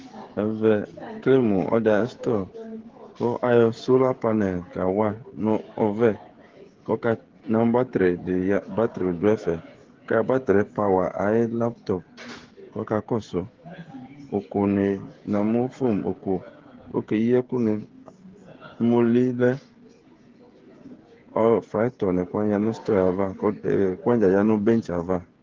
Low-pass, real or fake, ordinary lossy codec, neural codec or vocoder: 7.2 kHz; fake; Opus, 16 kbps; codec, 16 kHz, 16 kbps, FreqCodec, smaller model